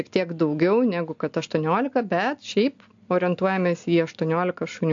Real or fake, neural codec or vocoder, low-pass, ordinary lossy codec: real; none; 7.2 kHz; AAC, 64 kbps